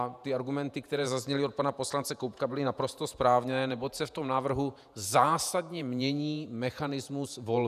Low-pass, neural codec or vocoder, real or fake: 14.4 kHz; vocoder, 48 kHz, 128 mel bands, Vocos; fake